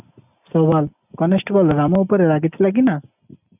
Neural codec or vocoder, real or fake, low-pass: none; real; 3.6 kHz